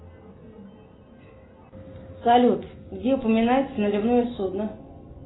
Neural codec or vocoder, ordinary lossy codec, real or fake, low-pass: none; AAC, 16 kbps; real; 7.2 kHz